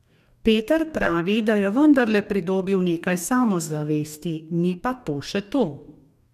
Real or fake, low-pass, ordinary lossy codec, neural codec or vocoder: fake; 14.4 kHz; none; codec, 44.1 kHz, 2.6 kbps, DAC